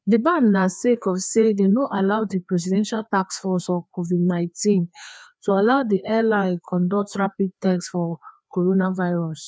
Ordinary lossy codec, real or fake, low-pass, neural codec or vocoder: none; fake; none; codec, 16 kHz, 2 kbps, FreqCodec, larger model